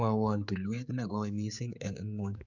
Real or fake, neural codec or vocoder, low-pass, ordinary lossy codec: fake; codec, 16 kHz, 4 kbps, X-Codec, HuBERT features, trained on general audio; 7.2 kHz; none